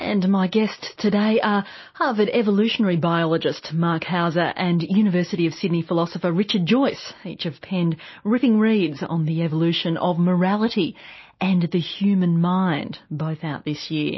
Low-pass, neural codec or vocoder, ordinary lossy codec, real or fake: 7.2 kHz; none; MP3, 24 kbps; real